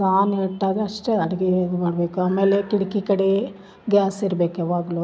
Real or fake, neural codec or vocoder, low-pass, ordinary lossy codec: real; none; none; none